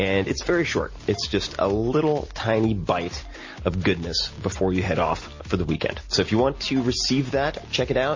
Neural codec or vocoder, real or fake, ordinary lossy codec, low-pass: none; real; MP3, 32 kbps; 7.2 kHz